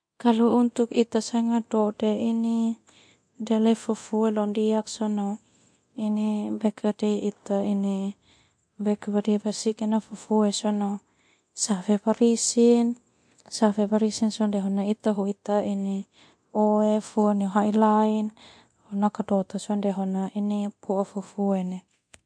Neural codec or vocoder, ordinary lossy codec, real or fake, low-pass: codec, 24 kHz, 0.9 kbps, DualCodec; MP3, 48 kbps; fake; 9.9 kHz